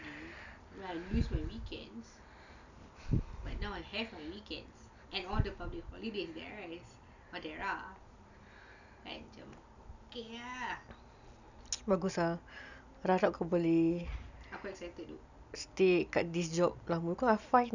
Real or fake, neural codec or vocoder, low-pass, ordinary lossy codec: real; none; 7.2 kHz; none